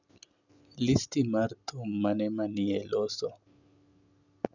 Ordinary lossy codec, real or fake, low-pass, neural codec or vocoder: none; real; 7.2 kHz; none